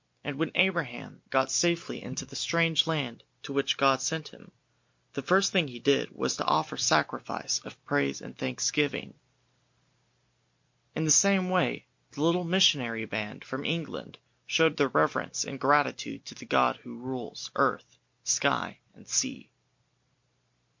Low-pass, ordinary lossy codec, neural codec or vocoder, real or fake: 7.2 kHz; MP3, 48 kbps; none; real